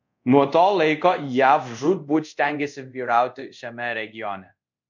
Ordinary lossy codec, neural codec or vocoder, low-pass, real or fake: MP3, 64 kbps; codec, 24 kHz, 0.5 kbps, DualCodec; 7.2 kHz; fake